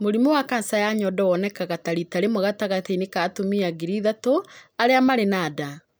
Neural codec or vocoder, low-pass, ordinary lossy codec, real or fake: none; none; none; real